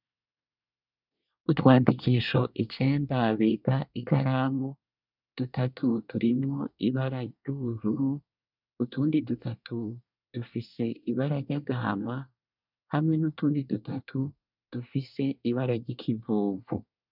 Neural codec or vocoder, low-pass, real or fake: codec, 24 kHz, 1 kbps, SNAC; 5.4 kHz; fake